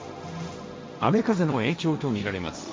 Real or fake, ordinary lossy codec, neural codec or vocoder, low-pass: fake; none; codec, 16 kHz, 1.1 kbps, Voila-Tokenizer; none